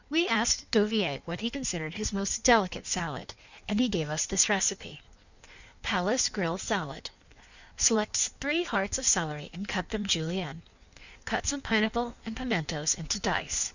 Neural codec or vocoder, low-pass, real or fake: codec, 16 kHz in and 24 kHz out, 1.1 kbps, FireRedTTS-2 codec; 7.2 kHz; fake